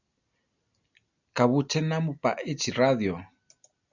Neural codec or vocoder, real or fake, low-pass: none; real; 7.2 kHz